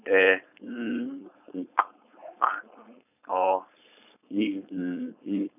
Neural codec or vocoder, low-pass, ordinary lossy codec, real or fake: codec, 16 kHz, 4.8 kbps, FACodec; 3.6 kHz; none; fake